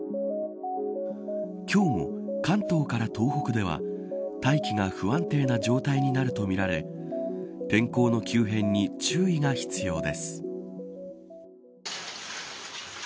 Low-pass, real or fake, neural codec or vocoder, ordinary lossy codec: none; real; none; none